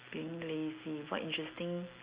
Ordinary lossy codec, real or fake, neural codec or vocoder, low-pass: Opus, 64 kbps; real; none; 3.6 kHz